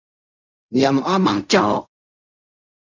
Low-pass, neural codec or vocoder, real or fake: 7.2 kHz; codec, 16 kHz in and 24 kHz out, 0.4 kbps, LongCat-Audio-Codec, fine tuned four codebook decoder; fake